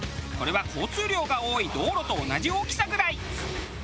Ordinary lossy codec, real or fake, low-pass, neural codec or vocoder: none; real; none; none